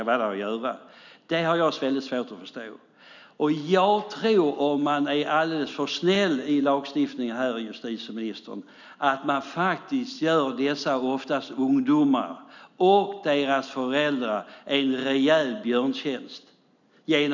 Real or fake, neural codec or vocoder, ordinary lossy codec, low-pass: real; none; none; 7.2 kHz